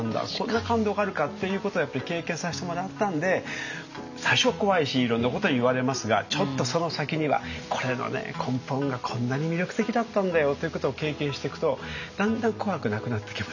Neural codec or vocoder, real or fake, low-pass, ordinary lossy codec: none; real; 7.2 kHz; none